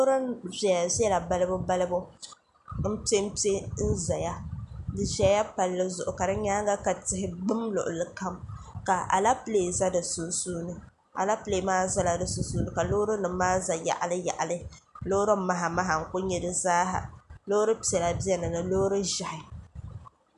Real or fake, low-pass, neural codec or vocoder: real; 10.8 kHz; none